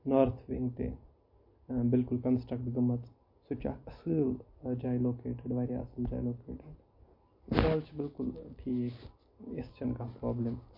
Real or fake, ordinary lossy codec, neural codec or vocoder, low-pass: real; MP3, 32 kbps; none; 5.4 kHz